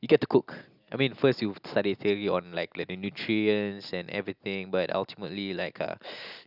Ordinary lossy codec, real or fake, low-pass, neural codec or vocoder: none; real; 5.4 kHz; none